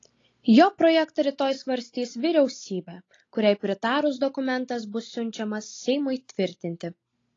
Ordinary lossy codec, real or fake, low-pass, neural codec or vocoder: AAC, 32 kbps; real; 7.2 kHz; none